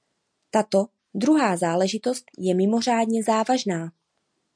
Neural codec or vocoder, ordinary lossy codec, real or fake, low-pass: none; MP3, 96 kbps; real; 9.9 kHz